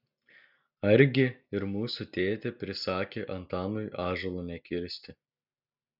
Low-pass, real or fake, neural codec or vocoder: 5.4 kHz; real; none